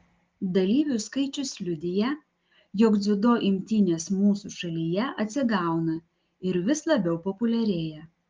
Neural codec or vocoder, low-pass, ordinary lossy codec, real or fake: none; 7.2 kHz; Opus, 24 kbps; real